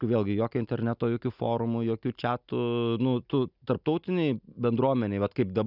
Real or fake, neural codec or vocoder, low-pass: real; none; 5.4 kHz